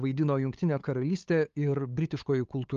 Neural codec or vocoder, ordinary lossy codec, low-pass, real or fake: codec, 16 kHz, 8 kbps, FunCodec, trained on LibriTTS, 25 frames a second; Opus, 32 kbps; 7.2 kHz; fake